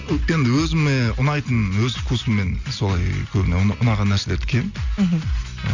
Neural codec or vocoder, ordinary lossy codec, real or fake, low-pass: none; Opus, 64 kbps; real; 7.2 kHz